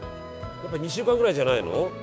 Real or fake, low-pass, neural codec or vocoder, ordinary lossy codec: fake; none; codec, 16 kHz, 6 kbps, DAC; none